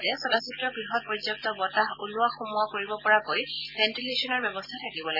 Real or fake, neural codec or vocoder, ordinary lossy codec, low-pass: real; none; none; 5.4 kHz